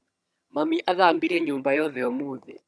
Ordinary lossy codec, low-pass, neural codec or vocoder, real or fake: none; none; vocoder, 22.05 kHz, 80 mel bands, HiFi-GAN; fake